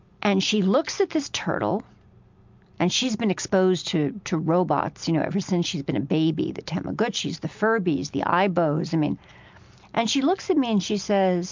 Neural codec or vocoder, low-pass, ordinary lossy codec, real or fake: none; 7.2 kHz; MP3, 64 kbps; real